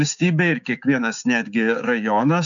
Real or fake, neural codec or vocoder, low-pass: fake; codec, 16 kHz, 6 kbps, DAC; 7.2 kHz